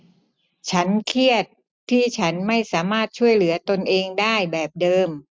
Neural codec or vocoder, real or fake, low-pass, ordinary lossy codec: none; real; none; none